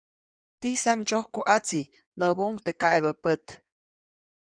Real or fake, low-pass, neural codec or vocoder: fake; 9.9 kHz; codec, 16 kHz in and 24 kHz out, 1.1 kbps, FireRedTTS-2 codec